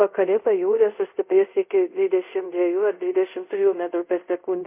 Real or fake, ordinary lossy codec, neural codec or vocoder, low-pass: fake; MP3, 32 kbps; codec, 24 kHz, 0.5 kbps, DualCodec; 3.6 kHz